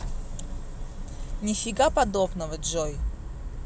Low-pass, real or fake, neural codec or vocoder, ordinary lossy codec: none; real; none; none